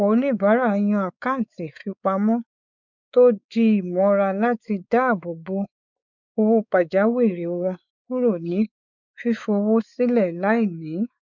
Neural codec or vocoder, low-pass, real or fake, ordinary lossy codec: codec, 16 kHz, 4 kbps, X-Codec, WavLM features, trained on Multilingual LibriSpeech; 7.2 kHz; fake; none